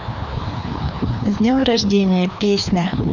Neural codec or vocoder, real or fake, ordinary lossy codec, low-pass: codec, 16 kHz, 2 kbps, FreqCodec, larger model; fake; none; 7.2 kHz